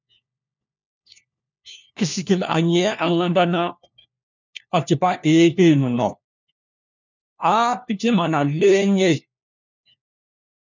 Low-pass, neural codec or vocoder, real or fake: 7.2 kHz; codec, 16 kHz, 1 kbps, FunCodec, trained on LibriTTS, 50 frames a second; fake